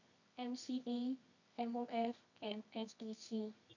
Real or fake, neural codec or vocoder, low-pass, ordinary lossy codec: fake; codec, 24 kHz, 0.9 kbps, WavTokenizer, medium music audio release; 7.2 kHz; none